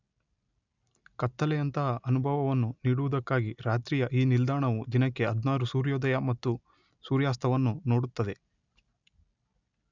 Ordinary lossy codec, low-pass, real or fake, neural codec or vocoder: none; 7.2 kHz; real; none